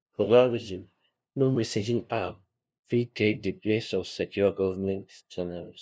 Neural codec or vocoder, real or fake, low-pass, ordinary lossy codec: codec, 16 kHz, 0.5 kbps, FunCodec, trained on LibriTTS, 25 frames a second; fake; none; none